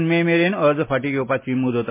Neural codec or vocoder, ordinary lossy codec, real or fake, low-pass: none; MP3, 24 kbps; real; 3.6 kHz